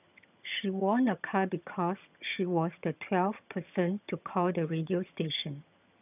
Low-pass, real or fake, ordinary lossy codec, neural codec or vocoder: 3.6 kHz; fake; none; vocoder, 22.05 kHz, 80 mel bands, HiFi-GAN